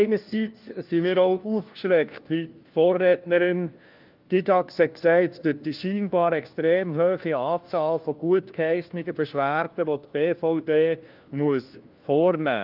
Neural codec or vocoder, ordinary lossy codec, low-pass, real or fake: codec, 16 kHz, 1 kbps, FunCodec, trained on LibriTTS, 50 frames a second; Opus, 32 kbps; 5.4 kHz; fake